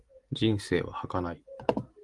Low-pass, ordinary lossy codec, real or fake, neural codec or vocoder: 10.8 kHz; Opus, 24 kbps; real; none